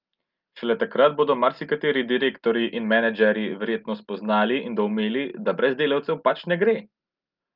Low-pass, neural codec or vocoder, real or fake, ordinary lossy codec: 5.4 kHz; none; real; Opus, 24 kbps